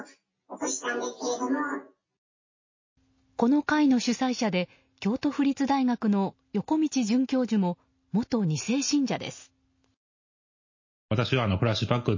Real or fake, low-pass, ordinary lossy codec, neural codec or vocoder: real; 7.2 kHz; MP3, 32 kbps; none